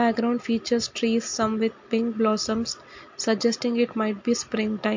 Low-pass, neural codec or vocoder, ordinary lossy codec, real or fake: 7.2 kHz; none; MP3, 48 kbps; real